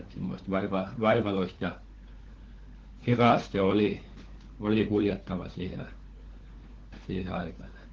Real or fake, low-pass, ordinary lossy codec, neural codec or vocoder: fake; 7.2 kHz; Opus, 16 kbps; codec, 16 kHz, 4 kbps, FunCodec, trained on LibriTTS, 50 frames a second